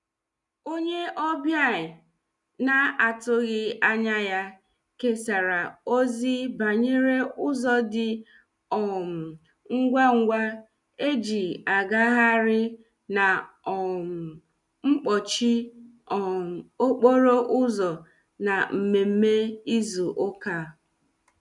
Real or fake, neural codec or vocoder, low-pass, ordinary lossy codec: real; none; 10.8 kHz; none